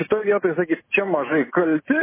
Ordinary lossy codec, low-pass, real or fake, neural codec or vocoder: MP3, 16 kbps; 3.6 kHz; real; none